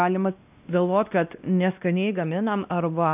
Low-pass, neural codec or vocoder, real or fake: 3.6 kHz; codec, 16 kHz, 1 kbps, X-Codec, WavLM features, trained on Multilingual LibriSpeech; fake